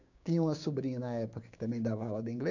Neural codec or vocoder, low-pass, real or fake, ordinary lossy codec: codec, 16 kHz, 6 kbps, DAC; 7.2 kHz; fake; none